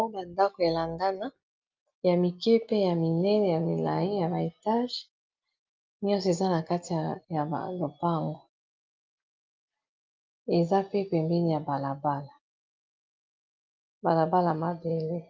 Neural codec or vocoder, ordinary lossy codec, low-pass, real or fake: none; Opus, 24 kbps; 7.2 kHz; real